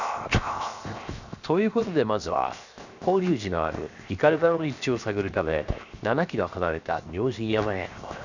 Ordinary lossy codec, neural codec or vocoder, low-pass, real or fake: none; codec, 16 kHz, 0.7 kbps, FocalCodec; 7.2 kHz; fake